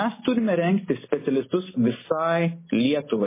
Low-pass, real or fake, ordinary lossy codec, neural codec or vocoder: 3.6 kHz; real; MP3, 16 kbps; none